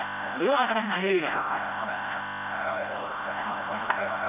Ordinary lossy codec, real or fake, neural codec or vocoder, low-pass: none; fake; codec, 16 kHz, 0.5 kbps, FreqCodec, smaller model; 3.6 kHz